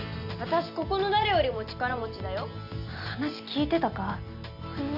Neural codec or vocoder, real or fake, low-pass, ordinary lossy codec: none; real; 5.4 kHz; none